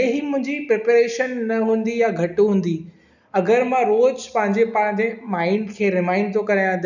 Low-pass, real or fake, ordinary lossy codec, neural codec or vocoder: 7.2 kHz; real; none; none